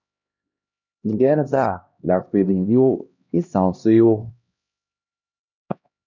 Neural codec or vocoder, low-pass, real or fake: codec, 16 kHz, 1 kbps, X-Codec, HuBERT features, trained on LibriSpeech; 7.2 kHz; fake